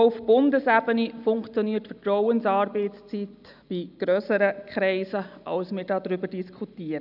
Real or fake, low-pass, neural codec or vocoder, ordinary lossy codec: real; 5.4 kHz; none; none